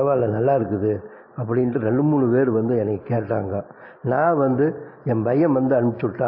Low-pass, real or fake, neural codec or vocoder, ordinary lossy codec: 5.4 kHz; real; none; MP3, 24 kbps